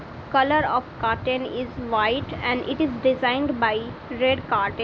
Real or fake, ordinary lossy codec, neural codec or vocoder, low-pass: real; none; none; none